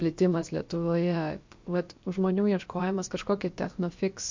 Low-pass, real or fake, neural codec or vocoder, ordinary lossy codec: 7.2 kHz; fake; codec, 16 kHz, about 1 kbps, DyCAST, with the encoder's durations; MP3, 48 kbps